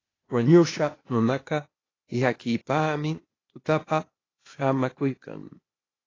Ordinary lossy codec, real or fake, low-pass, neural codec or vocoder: AAC, 32 kbps; fake; 7.2 kHz; codec, 16 kHz, 0.8 kbps, ZipCodec